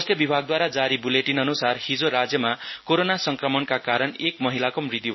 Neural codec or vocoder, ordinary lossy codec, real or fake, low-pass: none; MP3, 24 kbps; real; 7.2 kHz